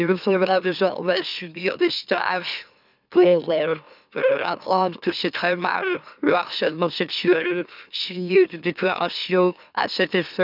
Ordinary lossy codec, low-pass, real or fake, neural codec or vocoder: none; 5.4 kHz; fake; autoencoder, 44.1 kHz, a latent of 192 numbers a frame, MeloTTS